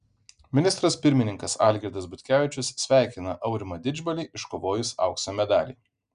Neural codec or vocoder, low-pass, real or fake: none; 9.9 kHz; real